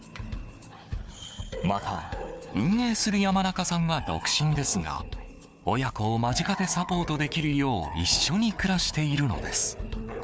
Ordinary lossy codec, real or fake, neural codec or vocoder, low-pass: none; fake; codec, 16 kHz, 4 kbps, FunCodec, trained on Chinese and English, 50 frames a second; none